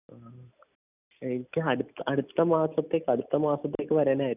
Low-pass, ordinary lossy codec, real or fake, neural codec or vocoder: 3.6 kHz; none; real; none